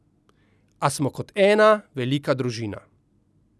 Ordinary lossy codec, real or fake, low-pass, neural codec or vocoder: none; real; none; none